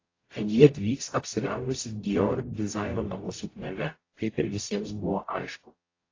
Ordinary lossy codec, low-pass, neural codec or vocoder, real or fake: AAC, 32 kbps; 7.2 kHz; codec, 44.1 kHz, 0.9 kbps, DAC; fake